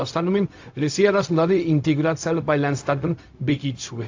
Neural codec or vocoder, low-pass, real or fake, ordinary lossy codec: codec, 16 kHz, 0.4 kbps, LongCat-Audio-Codec; 7.2 kHz; fake; AAC, 48 kbps